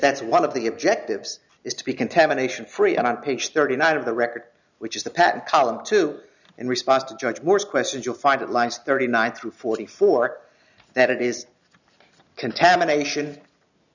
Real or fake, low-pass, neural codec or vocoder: real; 7.2 kHz; none